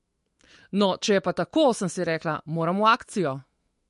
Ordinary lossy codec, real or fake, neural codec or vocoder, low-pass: MP3, 48 kbps; fake; autoencoder, 48 kHz, 128 numbers a frame, DAC-VAE, trained on Japanese speech; 14.4 kHz